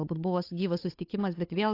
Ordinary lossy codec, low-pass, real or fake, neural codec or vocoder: AAC, 32 kbps; 5.4 kHz; fake; codec, 16 kHz, 8 kbps, FunCodec, trained on Chinese and English, 25 frames a second